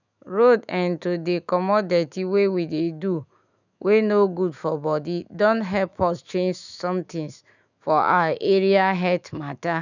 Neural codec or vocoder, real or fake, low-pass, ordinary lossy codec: autoencoder, 48 kHz, 128 numbers a frame, DAC-VAE, trained on Japanese speech; fake; 7.2 kHz; none